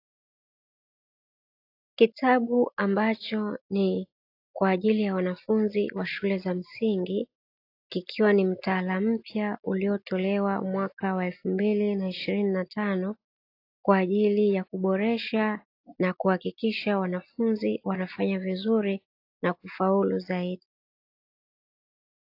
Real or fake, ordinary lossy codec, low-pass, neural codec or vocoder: real; AAC, 32 kbps; 5.4 kHz; none